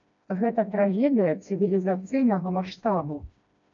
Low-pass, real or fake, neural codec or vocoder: 7.2 kHz; fake; codec, 16 kHz, 1 kbps, FreqCodec, smaller model